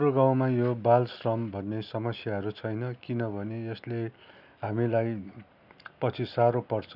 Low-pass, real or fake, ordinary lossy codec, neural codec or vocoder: 5.4 kHz; real; none; none